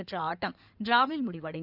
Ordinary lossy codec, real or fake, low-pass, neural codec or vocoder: none; fake; 5.4 kHz; codec, 16 kHz in and 24 kHz out, 2.2 kbps, FireRedTTS-2 codec